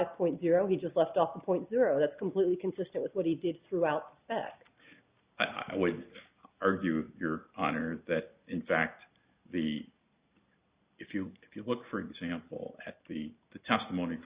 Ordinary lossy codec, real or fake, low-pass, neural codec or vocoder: Opus, 64 kbps; real; 3.6 kHz; none